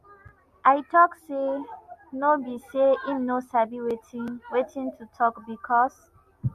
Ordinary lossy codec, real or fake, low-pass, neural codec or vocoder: MP3, 64 kbps; real; 14.4 kHz; none